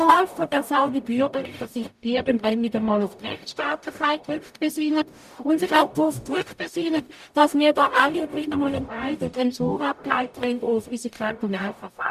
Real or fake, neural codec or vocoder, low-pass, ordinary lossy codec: fake; codec, 44.1 kHz, 0.9 kbps, DAC; 14.4 kHz; none